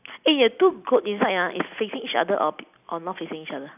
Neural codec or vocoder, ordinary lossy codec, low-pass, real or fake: none; none; 3.6 kHz; real